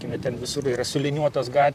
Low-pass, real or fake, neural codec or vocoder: 14.4 kHz; fake; vocoder, 44.1 kHz, 128 mel bands, Pupu-Vocoder